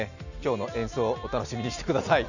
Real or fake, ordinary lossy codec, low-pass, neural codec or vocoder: real; none; 7.2 kHz; none